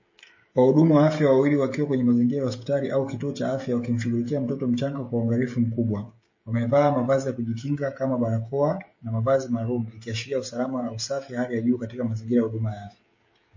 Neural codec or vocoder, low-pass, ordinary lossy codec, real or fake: codec, 16 kHz, 16 kbps, FreqCodec, smaller model; 7.2 kHz; MP3, 32 kbps; fake